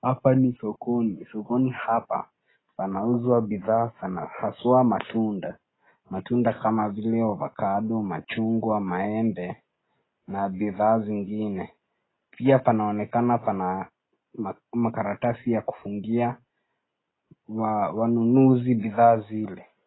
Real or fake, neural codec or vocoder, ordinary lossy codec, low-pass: real; none; AAC, 16 kbps; 7.2 kHz